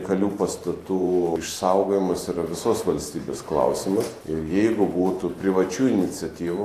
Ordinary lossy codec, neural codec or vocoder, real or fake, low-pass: AAC, 64 kbps; vocoder, 44.1 kHz, 128 mel bands every 512 samples, BigVGAN v2; fake; 14.4 kHz